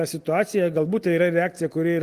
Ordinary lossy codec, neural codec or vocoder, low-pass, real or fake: Opus, 24 kbps; none; 14.4 kHz; real